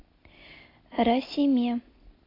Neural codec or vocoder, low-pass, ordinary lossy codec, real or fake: none; 5.4 kHz; AAC, 24 kbps; real